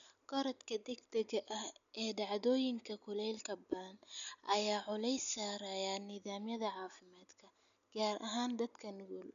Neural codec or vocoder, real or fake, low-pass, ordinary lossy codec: none; real; 7.2 kHz; none